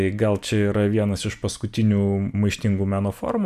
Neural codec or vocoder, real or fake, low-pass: none; real; 14.4 kHz